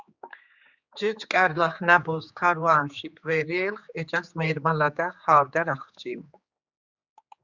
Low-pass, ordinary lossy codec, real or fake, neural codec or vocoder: 7.2 kHz; Opus, 64 kbps; fake; codec, 16 kHz, 4 kbps, X-Codec, HuBERT features, trained on general audio